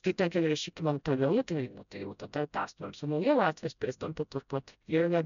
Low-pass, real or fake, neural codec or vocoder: 7.2 kHz; fake; codec, 16 kHz, 0.5 kbps, FreqCodec, smaller model